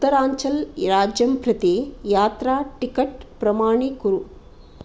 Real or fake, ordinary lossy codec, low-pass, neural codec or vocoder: real; none; none; none